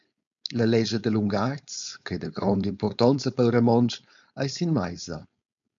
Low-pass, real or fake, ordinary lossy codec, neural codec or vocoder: 7.2 kHz; fake; MP3, 64 kbps; codec, 16 kHz, 4.8 kbps, FACodec